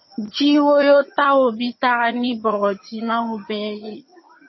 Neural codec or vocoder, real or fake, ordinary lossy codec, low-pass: vocoder, 22.05 kHz, 80 mel bands, HiFi-GAN; fake; MP3, 24 kbps; 7.2 kHz